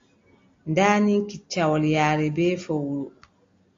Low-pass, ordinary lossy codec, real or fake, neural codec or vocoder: 7.2 kHz; AAC, 48 kbps; real; none